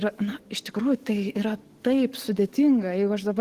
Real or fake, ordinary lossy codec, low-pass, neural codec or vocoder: fake; Opus, 16 kbps; 14.4 kHz; codec, 44.1 kHz, 7.8 kbps, DAC